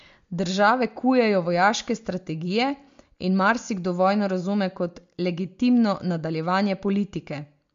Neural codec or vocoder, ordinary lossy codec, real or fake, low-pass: none; MP3, 48 kbps; real; 7.2 kHz